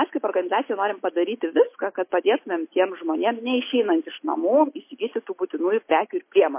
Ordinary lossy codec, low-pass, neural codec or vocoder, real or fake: MP3, 24 kbps; 3.6 kHz; none; real